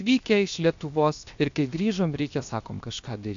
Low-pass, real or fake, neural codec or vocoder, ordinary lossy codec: 7.2 kHz; fake; codec, 16 kHz, 0.7 kbps, FocalCodec; MP3, 64 kbps